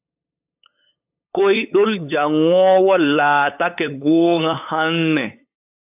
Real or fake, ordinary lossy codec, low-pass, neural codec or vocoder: fake; AAC, 32 kbps; 3.6 kHz; codec, 16 kHz, 8 kbps, FunCodec, trained on LibriTTS, 25 frames a second